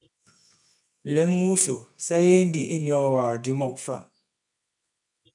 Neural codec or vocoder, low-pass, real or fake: codec, 24 kHz, 0.9 kbps, WavTokenizer, medium music audio release; 10.8 kHz; fake